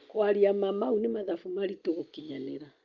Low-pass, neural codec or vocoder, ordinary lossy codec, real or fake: 7.2 kHz; none; Opus, 24 kbps; real